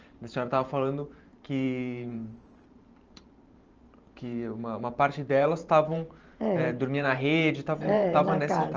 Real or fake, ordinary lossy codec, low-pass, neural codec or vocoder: fake; Opus, 24 kbps; 7.2 kHz; vocoder, 44.1 kHz, 128 mel bands every 512 samples, BigVGAN v2